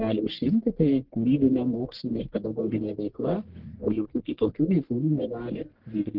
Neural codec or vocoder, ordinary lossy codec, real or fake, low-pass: codec, 44.1 kHz, 1.7 kbps, Pupu-Codec; Opus, 16 kbps; fake; 5.4 kHz